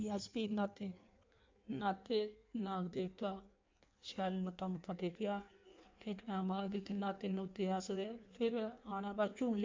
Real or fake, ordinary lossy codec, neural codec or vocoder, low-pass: fake; MP3, 64 kbps; codec, 16 kHz in and 24 kHz out, 1.1 kbps, FireRedTTS-2 codec; 7.2 kHz